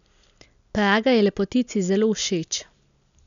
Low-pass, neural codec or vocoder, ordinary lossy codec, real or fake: 7.2 kHz; none; none; real